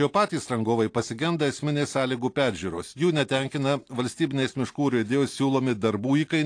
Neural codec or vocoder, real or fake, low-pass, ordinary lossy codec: none; real; 9.9 kHz; AAC, 48 kbps